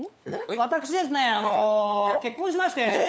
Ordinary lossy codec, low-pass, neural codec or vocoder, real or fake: none; none; codec, 16 kHz, 4 kbps, FunCodec, trained on LibriTTS, 50 frames a second; fake